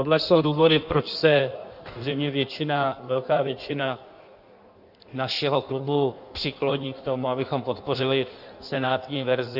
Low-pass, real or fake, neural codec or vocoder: 5.4 kHz; fake; codec, 16 kHz in and 24 kHz out, 1.1 kbps, FireRedTTS-2 codec